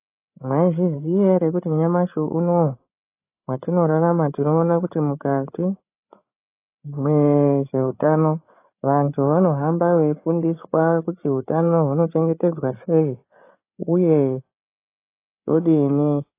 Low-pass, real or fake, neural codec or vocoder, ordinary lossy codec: 3.6 kHz; fake; codec, 16 kHz, 8 kbps, FreqCodec, larger model; AAC, 24 kbps